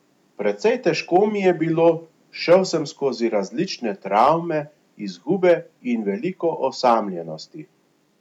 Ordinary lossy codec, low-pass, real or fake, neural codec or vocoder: none; 19.8 kHz; real; none